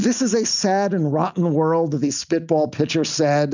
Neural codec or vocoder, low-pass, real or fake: none; 7.2 kHz; real